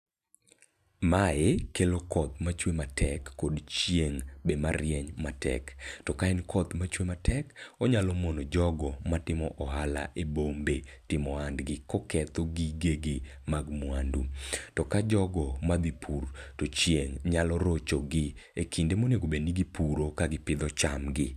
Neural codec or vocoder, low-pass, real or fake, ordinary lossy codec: none; 14.4 kHz; real; none